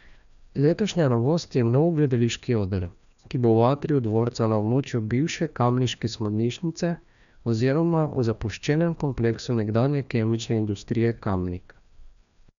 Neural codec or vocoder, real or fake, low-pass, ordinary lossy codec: codec, 16 kHz, 1 kbps, FreqCodec, larger model; fake; 7.2 kHz; none